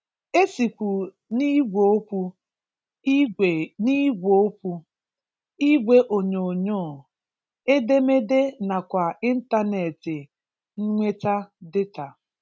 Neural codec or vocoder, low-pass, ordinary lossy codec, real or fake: none; none; none; real